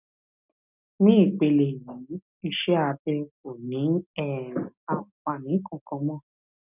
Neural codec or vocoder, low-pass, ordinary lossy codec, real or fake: none; 3.6 kHz; none; real